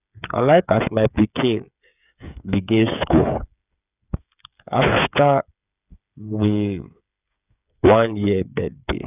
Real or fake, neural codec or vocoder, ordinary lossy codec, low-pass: fake; codec, 16 kHz, 8 kbps, FreqCodec, smaller model; none; 3.6 kHz